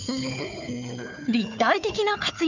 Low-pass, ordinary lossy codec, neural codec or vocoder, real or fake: 7.2 kHz; none; codec, 16 kHz, 4 kbps, FunCodec, trained on Chinese and English, 50 frames a second; fake